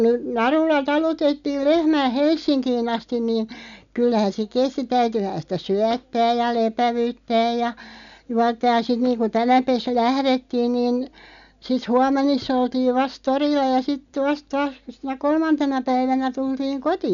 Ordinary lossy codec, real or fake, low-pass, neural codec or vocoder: MP3, 96 kbps; real; 7.2 kHz; none